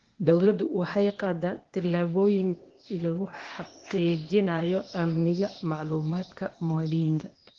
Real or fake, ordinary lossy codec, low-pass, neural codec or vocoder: fake; Opus, 16 kbps; 7.2 kHz; codec, 16 kHz, 0.8 kbps, ZipCodec